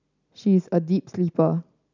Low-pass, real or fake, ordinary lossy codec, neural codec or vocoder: 7.2 kHz; real; none; none